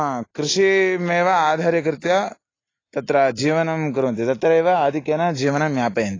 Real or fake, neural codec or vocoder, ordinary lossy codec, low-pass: real; none; AAC, 32 kbps; 7.2 kHz